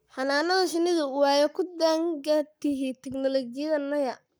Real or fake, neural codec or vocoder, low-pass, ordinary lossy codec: fake; codec, 44.1 kHz, 7.8 kbps, Pupu-Codec; none; none